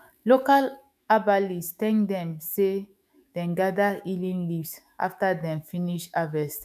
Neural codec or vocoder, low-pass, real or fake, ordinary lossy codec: autoencoder, 48 kHz, 128 numbers a frame, DAC-VAE, trained on Japanese speech; 14.4 kHz; fake; none